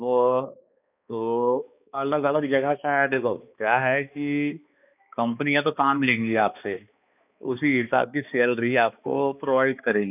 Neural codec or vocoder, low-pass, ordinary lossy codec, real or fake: codec, 16 kHz, 2 kbps, X-Codec, HuBERT features, trained on balanced general audio; 3.6 kHz; none; fake